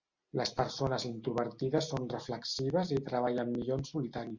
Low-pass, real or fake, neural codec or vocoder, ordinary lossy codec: 7.2 kHz; real; none; Opus, 64 kbps